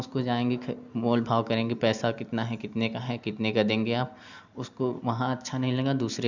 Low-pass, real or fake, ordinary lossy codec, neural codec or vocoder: 7.2 kHz; real; none; none